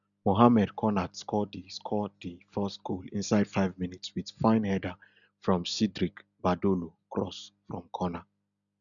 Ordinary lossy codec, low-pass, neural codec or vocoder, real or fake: none; 7.2 kHz; none; real